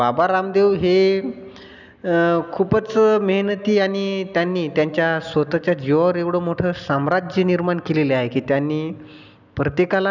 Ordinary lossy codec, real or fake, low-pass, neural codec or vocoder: none; real; 7.2 kHz; none